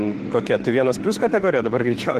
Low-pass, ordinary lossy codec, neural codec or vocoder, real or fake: 14.4 kHz; Opus, 16 kbps; autoencoder, 48 kHz, 32 numbers a frame, DAC-VAE, trained on Japanese speech; fake